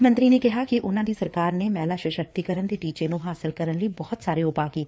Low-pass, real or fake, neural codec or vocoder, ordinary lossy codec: none; fake; codec, 16 kHz, 4 kbps, FreqCodec, larger model; none